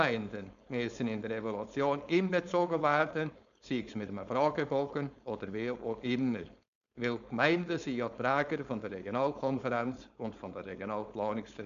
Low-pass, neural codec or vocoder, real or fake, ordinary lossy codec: 7.2 kHz; codec, 16 kHz, 4.8 kbps, FACodec; fake; none